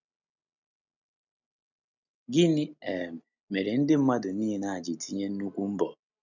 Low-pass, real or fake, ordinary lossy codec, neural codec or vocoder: 7.2 kHz; real; none; none